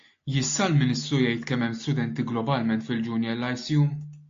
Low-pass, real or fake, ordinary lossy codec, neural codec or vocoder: 7.2 kHz; real; AAC, 48 kbps; none